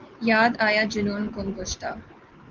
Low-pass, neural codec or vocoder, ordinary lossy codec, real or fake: 7.2 kHz; none; Opus, 16 kbps; real